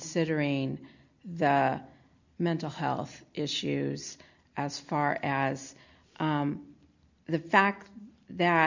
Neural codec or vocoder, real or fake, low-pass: none; real; 7.2 kHz